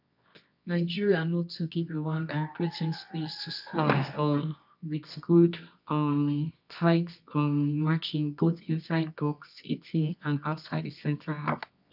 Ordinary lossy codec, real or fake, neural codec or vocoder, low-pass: none; fake; codec, 24 kHz, 0.9 kbps, WavTokenizer, medium music audio release; 5.4 kHz